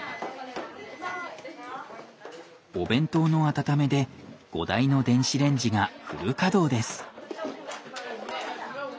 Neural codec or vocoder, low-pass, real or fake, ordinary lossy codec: none; none; real; none